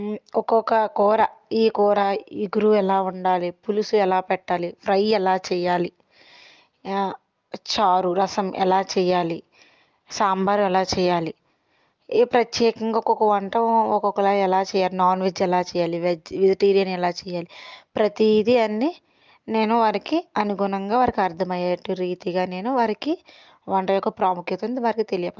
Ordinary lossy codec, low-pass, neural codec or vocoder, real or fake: Opus, 24 kbps; 7.2 kHz; none; real